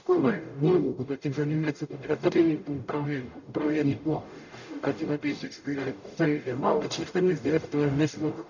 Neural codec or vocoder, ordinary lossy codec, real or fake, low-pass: codec, 44.1 kHz, 0.9 kbps, DAC; none; fake; 7.2 kHz